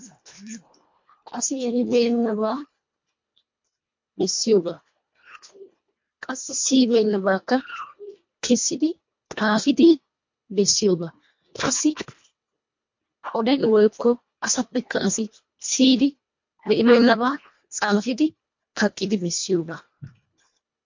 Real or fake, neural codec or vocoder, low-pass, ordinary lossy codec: fake; codec, 24 kHz, 1.5 kbps, HILCodec; 7.2 kHz; MP3, 48 kbps